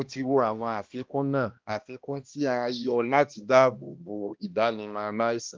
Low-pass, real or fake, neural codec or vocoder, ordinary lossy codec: 7.2 kHz; fake; codec, 16 kHz, 1 kbps, X-Codec, HuBERT features, trained on balanced general audio; Opus, 32 kbps